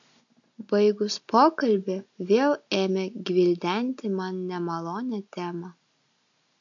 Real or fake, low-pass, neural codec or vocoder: real; 7.2 kHz; none